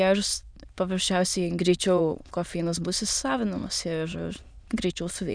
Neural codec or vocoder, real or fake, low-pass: autoencoder, 22.05 kHz, a latent of 192 numbers a frame, VITS, trained on many speakers; fake; 9.9 kHz